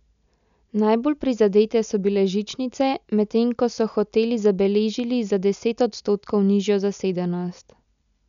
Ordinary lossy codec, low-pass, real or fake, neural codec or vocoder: none; 7.2 kHz; real; none